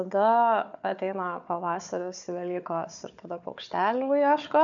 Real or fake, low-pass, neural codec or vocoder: fake; 7.2 kHz; codec, 16 kHz, 4 kbps, FunCodec, trained on Chinese and English, 50 frames a second